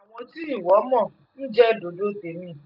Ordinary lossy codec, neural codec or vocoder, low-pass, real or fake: none; none; 5.4 kHz; real